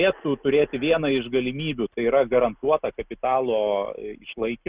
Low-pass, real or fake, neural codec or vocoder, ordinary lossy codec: 3.6 kHz; real; none; Opus, 64 kbps